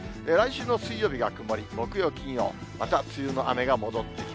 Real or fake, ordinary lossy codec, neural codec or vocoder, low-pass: real; none; none; none